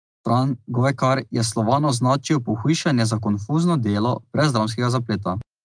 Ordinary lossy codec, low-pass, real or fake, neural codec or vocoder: Opus, 32 kbps; 9.9 kHz; real; none